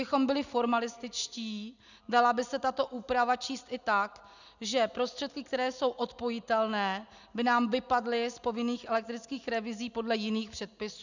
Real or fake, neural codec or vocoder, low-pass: real; none; 7.2 kHz